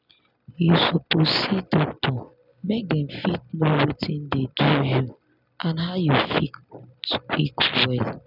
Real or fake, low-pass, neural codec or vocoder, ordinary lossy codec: real; 5.4 kHz; none; none